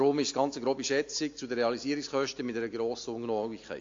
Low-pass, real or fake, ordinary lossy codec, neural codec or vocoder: 7.2 kHz; real; AAC, 48 kbps; none